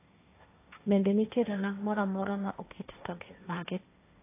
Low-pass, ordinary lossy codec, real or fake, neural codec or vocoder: 3.6 kHz; AAC, 24 kbps; fake; codec, 16 kHz, 1.1 kbps, Voila-Tokenizer